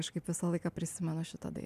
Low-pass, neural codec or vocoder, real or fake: 14.4 kHz; none; real